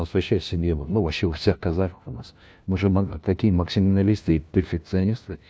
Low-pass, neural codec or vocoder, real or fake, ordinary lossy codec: none; codec, 16 kHz, 1 kbps, FunCodec, trained on LibriTTS, 50 frames a second; fake; none